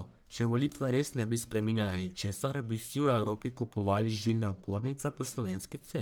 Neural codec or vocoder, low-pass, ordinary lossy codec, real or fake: codec, 44.1 kHz, 1.7 kbps, Pupu-Codec; none; none; fake